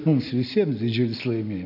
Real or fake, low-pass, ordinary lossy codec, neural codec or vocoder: real; 5.4 kHz; AAC, 48 kbps; none